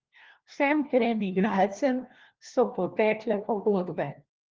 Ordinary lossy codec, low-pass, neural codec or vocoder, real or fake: Opus, 16 kbps; 7.2 kHz; codec, 16 kHz, 1 kbps, FunCodec, trained on LibriTTS, 50 frames a second; fake